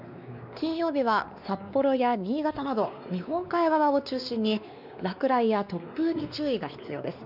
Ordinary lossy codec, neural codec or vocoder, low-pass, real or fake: none; codec, 16 kHz, 2 kbps, X-Codec, WavLM features, trained on Multilingual LibriSpeech; 5.4 kHz; fake